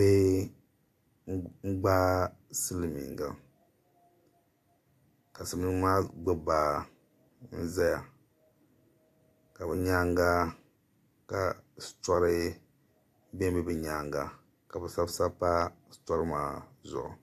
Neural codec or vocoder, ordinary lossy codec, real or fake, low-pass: none; AAC, 64 kbps; real; 14.4 kHz